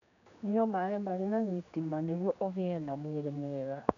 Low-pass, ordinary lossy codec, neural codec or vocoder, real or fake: 7.2 kHz; none; codec, 16 kHz, 2 kbps, X-Codec, HuBERT features, trained on general audio; fake